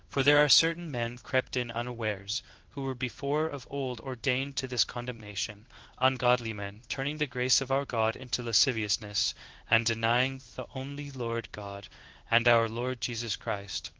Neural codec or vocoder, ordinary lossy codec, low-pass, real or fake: codec, 16 kHz in and 24 kHz out, 1 kbps, XY-Tokenizer; Opus, 16 kbps; 7.2 kHz; fake